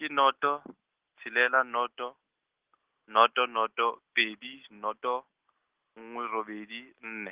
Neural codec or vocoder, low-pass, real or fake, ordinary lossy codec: none; 3.6 kHz; real; Opus, 16 kbps